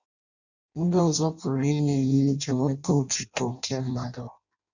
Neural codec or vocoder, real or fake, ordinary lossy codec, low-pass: codec, 16 kHz in and 24 kHz out, 0.6 kbps, FireRedTTS-2 codec; fake; none; 7.2 kHz